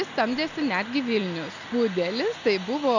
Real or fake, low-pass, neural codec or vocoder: real; 7.2 kHz; none